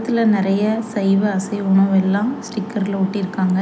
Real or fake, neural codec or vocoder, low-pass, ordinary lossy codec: real; none; none; none